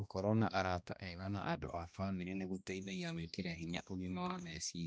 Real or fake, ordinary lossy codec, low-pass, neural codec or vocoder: fake; none; none; codec, 16 kHz, 1 kbps, X-Codec, HuBERT features, trained on balanced general audio